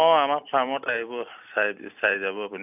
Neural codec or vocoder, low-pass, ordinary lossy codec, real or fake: none; 3.6 kHz; none; real